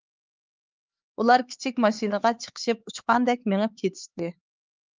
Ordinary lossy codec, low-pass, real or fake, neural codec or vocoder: Opus, 32 kbps; 7.2 kHz; fake; codec, 16 kHz, 4 kbps, X-Codec, HuBERT features, trained on LibriSpeech